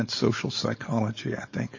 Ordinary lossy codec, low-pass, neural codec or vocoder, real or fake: MP3, 32 kbps; 7.2 kHz; codec, 16 kHz, 4.8 kbps, FACodec; fake